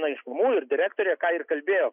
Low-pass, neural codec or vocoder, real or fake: 3.6 kHz; none; real